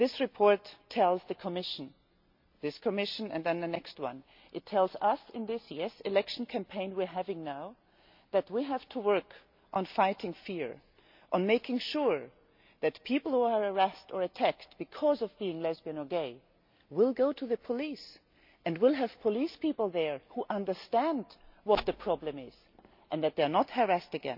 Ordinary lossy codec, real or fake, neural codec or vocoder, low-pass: MP3, 48 kbps; real; none; 5.4 kHz